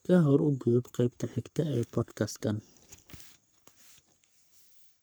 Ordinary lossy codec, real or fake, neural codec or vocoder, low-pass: none; fake; codec, 44.1 kHz, 3.4 kbps, Pupu-Codec; none